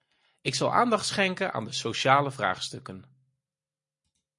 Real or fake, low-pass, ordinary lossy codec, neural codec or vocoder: real; 10.8 kHz; MP3, 48 kbps; none